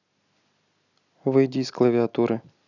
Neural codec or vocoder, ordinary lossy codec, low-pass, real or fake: none; none; 7.2 kHz; real